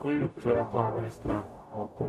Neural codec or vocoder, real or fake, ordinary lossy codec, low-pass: codec, 44.1 kHz, 0.9 kbps, DAC; fake; MP3, 64 kbps; 14.4 kHz